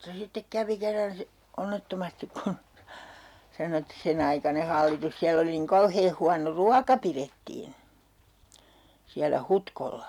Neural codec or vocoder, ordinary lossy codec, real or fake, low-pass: none; none; real; 19.8 kHz